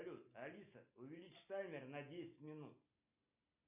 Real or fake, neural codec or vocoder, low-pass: real; none; 3.6 kHz